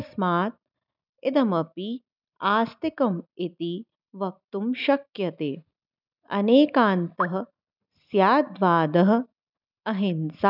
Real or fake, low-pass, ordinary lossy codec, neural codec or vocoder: real; 5.4 kHz; none; none